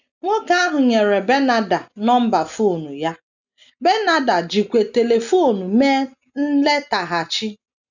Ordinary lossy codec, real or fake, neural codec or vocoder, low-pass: none; real; none; 7.2 kHz